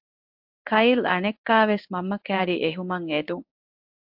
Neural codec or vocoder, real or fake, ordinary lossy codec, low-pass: codec, 16 kHz in and 24 kHz out, 1 kbps, XY-Tokenizer; fake; AAC, 48 kbps; 5.4 kHz